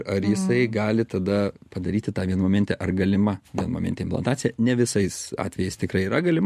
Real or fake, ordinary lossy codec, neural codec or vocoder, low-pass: real; MP3, 64 kbps; none; 14.4 kHz